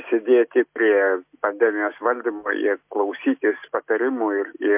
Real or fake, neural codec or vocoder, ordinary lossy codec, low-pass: real; none; MP3, 32 kbps; 3.6 kHz